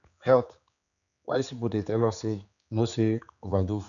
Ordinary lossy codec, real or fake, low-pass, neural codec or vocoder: none; fake; 7.2 kHz; codec, 16 kHz, 4 kbps, X-Codec, HuBERT features, trained on general audio